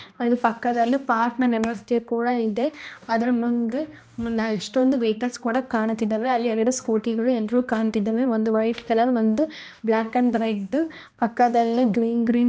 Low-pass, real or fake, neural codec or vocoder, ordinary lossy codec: none; fake; codec, 16 kHz, 1 kbps, X-Codec, HuBERT features, trained on balanced general audio; none